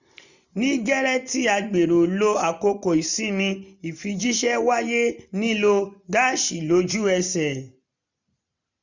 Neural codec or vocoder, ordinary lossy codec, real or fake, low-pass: none; none; real; 7.2 kHz